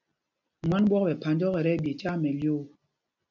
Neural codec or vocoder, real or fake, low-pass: none; real; 7.2 kHz